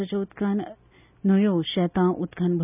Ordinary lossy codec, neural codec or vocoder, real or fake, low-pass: none; none; real; 3.6 kHz